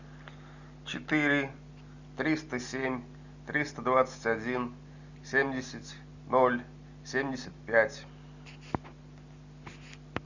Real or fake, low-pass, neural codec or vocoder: real; 7.2 kHz; none